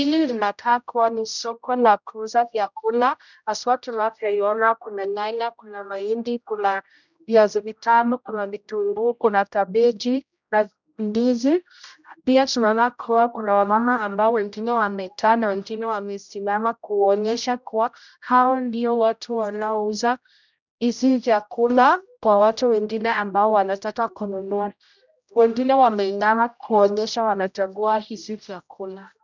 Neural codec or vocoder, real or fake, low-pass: codec, 16 kHz, 0.5 kbps, X-Codec, HuBERT features, trained on general audio; fake; 7.2 kHz